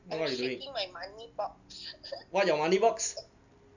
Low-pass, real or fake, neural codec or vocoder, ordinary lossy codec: 7.2 kHz; real; none; none